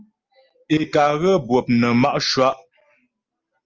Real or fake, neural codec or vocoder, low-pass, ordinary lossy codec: real; none; 7.2 kHz; Opus, 24 kbps